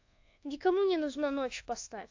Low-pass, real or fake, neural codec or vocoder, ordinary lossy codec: 7.2 kHz; fake; codec, 24 kHz, 1.2 kbps, DualCodec; AAC, 48 kbps